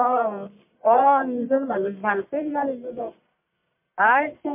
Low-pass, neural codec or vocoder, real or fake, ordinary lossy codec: 3.6 kHz; codec, 44.1 kHz, 1.7 kbps, Pupu-Codec; fake; MP3, 32 kbps